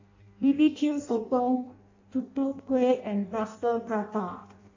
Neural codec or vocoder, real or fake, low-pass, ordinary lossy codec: codec, 16 kHz in and 24 kHz out, 0.6 kbps, FireRedTTS-2 codec; fake; 7.2 kHz; AAC, 48 kbps